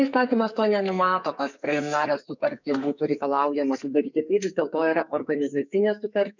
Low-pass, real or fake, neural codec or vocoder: 7.2 kHz; fake; codec, 44.1 kHz, 2.6 kbps, SNAC